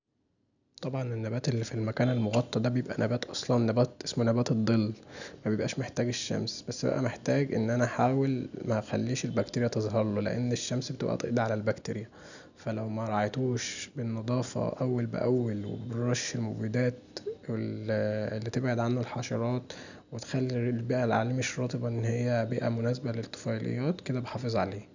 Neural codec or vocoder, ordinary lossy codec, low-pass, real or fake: none; none; 7.2 kHz; real